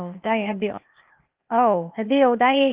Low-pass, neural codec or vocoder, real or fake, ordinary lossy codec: 3.6 kHz; codec, 16 kHz, 0.8 kbps, ZipCodec; fake; Opus, 32 kbps